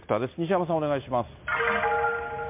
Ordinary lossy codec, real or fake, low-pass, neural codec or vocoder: MP3, 32 kbps; real; 3.6 kHz; none